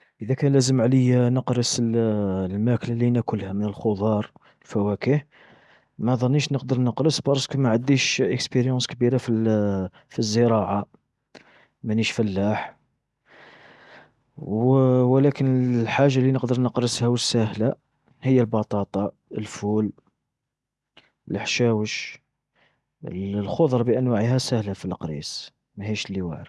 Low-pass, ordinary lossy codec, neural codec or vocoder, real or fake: none; none; none; real